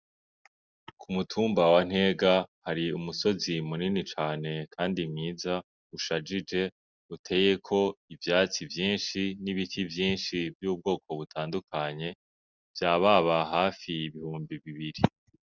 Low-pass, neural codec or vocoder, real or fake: 7.2 kHz; none; real